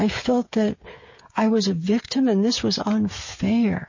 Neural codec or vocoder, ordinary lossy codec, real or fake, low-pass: codec, 16 kHz, 8 kbps, FreqCodec, smaller model; MP3, 32 kbps; fake; 7.2 kHz